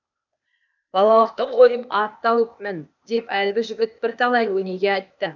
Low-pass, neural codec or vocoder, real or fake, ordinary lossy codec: 7.2 kHz; codec, 16 kHz, 0.8 kbps, ZipCodec; fake; none